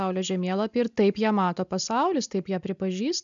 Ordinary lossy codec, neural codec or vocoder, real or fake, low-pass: MP3, 96 kbps; none; real; 7.2 kHz